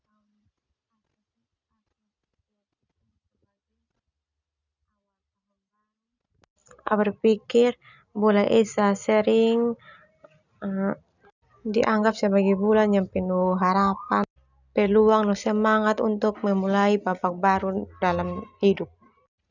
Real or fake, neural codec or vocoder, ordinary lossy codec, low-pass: real; none; none; 7.2 kHz